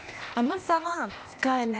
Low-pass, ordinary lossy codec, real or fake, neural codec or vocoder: none; none; fake; codec, 16 kHz, 0.8 kbps, ZipCodec